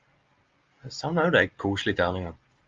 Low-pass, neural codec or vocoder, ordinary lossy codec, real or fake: 7.2 kHz; none; Opus, 32 kbps; real